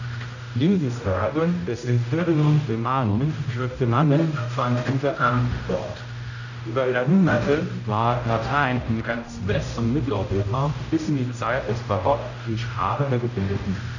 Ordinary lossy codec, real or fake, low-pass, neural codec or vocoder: none; fake; 7.2 kHz; codec, 16 kHz, 0.5 kbps, X-Codec, HuBERT features, trained on general audio